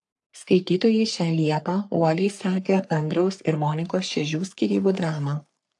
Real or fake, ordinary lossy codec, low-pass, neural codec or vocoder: fake; AAC, 64 kbps; 10.8 kHz; codec, 44.1 kHz, 3.4 kbps, Pupu-Codec